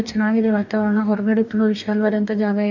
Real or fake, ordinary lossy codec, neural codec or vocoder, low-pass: fake; none; codec, 44.1 kHz, 2.6 kbps, DAC; 7.2 kHz